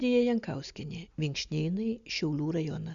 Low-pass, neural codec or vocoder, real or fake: 7.2 kHz; none; real